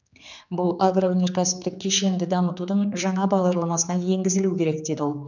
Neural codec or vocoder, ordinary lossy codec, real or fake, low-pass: codec, 16 kHz, 4 kbps, X-Codec, HuBERT features, trained on general audio; none; fake; 7.2 kHz